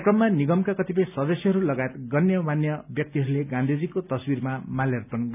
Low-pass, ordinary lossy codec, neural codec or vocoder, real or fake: 3.6 kHz; none; none; real